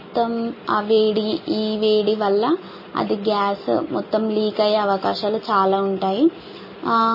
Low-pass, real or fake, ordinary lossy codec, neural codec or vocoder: 5.4 kHz; real; MP3, 24 kbps; none